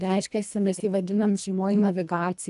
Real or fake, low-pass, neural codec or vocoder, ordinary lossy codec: fake; 10.8 kHz; codec, 24 kHz, 1.5 kbps, HILCodec; AAC, 96 kbps